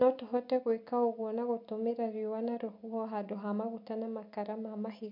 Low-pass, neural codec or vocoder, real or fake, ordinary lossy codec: 5.4 kHz; none; real; MP3, 48 kbps